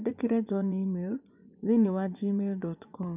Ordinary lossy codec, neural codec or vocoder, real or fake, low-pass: none; vocoder, 24 kHz, 100 mel bands, Vocos; fake; 3.6 kHz